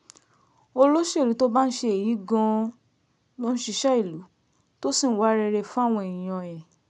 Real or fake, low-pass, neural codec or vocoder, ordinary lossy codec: real; 10.8 kHz; none; MP3, 96 kbps